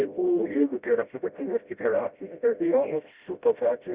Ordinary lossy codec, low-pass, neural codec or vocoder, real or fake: Opus, 64 kbps; 3.6 kHz; codec, 16 kHz, 0.5 kbps, FreqCodec, smaller model; fake